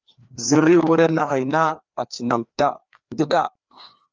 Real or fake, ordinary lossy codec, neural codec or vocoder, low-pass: fake; Opus, 24 kbps; codec, 16 kHz, 2 kbps, FreqCodec, larger model; 7.2 kHz